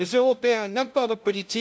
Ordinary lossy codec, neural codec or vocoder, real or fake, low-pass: none; codec, 16 kHz, 0.5 kbps, FunCodec, trained on LibriTTS, 25 frames a second; fake; none